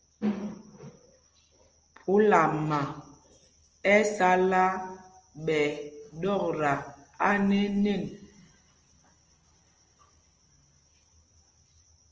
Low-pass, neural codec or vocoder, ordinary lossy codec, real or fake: 7.2 kHz; none; Opus, 24 kbps; real